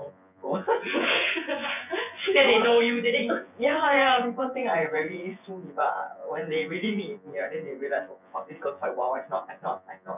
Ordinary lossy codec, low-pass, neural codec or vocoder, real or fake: none; 3.6 kHz; vocoder, 24 kHz, 100 mel bands, Vocos; fake